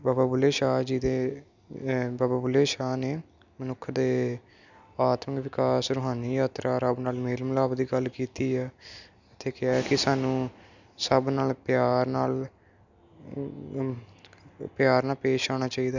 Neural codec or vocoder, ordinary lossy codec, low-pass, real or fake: none; none; 7.2 kHz; real